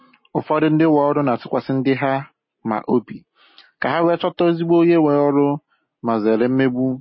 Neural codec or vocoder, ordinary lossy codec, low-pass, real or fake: none; MP3, 24 kbps; 7.2 kHz; real